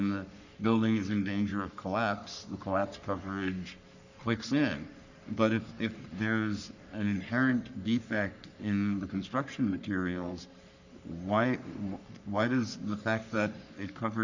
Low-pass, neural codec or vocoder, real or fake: 7.2 kHz; codec, 44.1 kHz, 3.4 kbps, Pupu-Codec; fake